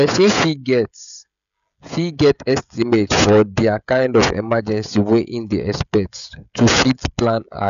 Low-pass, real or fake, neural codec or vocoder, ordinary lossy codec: 7.2 kHz; fake; codec, 16 kHz, 16 kbps, FreqCodec, smaller model; none